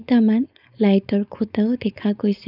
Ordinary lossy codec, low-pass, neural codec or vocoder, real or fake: none; 5.4 kHz; codec, 16 kHz, 4.8 kbps, FACodec; fake